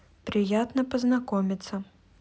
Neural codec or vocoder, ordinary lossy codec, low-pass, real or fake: none; none; none; real